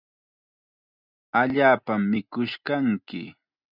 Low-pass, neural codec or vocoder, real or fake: 5.4 kHz; none; real